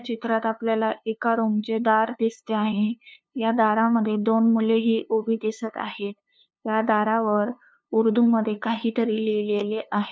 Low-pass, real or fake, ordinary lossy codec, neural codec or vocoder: none; fake; none; codec, 16 kHz, 2 kbps, FunCodec, trained on LibriTTS, 25 frames a second